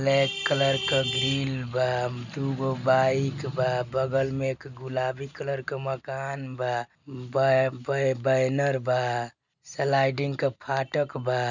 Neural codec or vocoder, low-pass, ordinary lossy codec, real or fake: none; 7.2 kHz; none; real